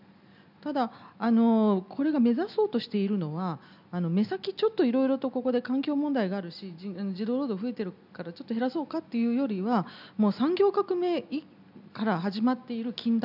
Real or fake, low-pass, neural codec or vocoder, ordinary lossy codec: real; 5.4 kHz; none; none